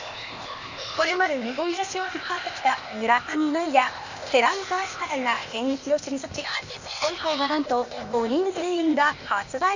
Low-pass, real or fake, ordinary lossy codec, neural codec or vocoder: 7.2 kHz; fake; Opus, 64 kbps; codec, 16 kHz, 0.8 kbps, ZipCodec